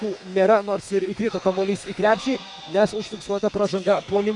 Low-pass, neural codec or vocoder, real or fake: 10.8 kHz; codec, 32 kHz, 1.9 kbps, SNAC; fake